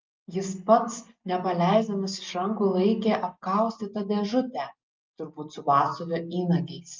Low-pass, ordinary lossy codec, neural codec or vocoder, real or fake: 7.2 kHz; Opus, 24 kbps; none; real